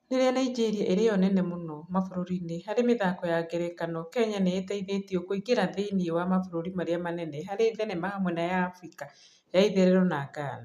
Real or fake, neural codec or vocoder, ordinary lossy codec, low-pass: real; none; none; 14.4 kHz